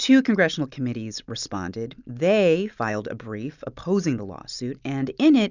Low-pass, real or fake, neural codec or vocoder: 7.2 kHz; real; none